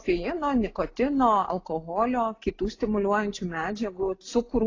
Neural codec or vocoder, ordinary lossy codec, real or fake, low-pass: none; AAC, 32 kbps; real; 7.2 kHz